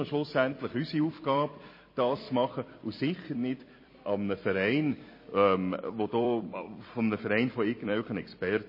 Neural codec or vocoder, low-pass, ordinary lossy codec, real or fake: vocoder, 44.1 kHz, 128 mel bands every 256 samples, BigVGAN v2; 5.4 kHz; MP3, 24 kbps; fake